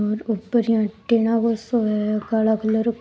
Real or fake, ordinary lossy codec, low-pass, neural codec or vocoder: real; none; none; none